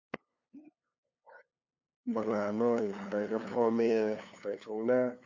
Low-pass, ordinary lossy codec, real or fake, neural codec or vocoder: 7.2 kHz; MP3, 48 kbps; fake; codec, 16 kHz, 2 kbps, FunCodec, trained on LibriTTS, 25 frames a second